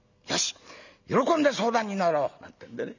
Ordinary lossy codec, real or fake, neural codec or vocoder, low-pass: none; real; none; 7.2 kHz